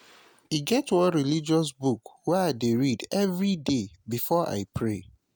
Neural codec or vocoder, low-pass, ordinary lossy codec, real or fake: none; none; none; real